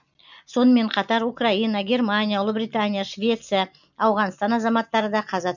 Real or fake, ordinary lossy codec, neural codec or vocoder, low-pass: real; none; none; 7.2 kHz